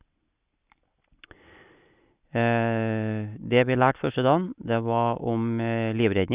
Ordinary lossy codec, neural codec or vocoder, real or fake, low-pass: Opus, 64 kbps; none; real; 3.6 kHz